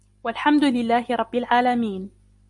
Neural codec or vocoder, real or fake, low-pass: none; real; 10.8 kHz